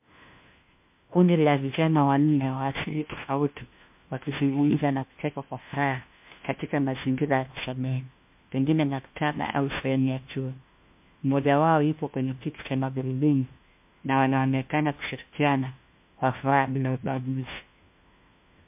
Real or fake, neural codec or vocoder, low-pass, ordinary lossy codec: fake; codec, 16 kHz, 1 kbps, FunCodec, trained on LibriTTS, 50 frames a second; 3.6 kHz; MP3, 32 kbps